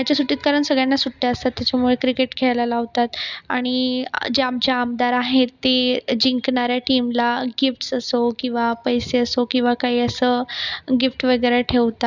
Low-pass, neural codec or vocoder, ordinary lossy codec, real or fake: 7.2 kHz; none; none; real